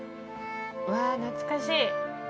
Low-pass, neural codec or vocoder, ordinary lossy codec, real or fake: none; none; none; real